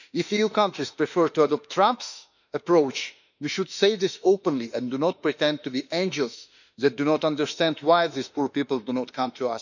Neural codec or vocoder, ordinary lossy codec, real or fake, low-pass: autoencoder, 48 kHz, 32 numbers a frame, DAC-VAE, trained on Japanese speech; none; fake; 7.2 kHz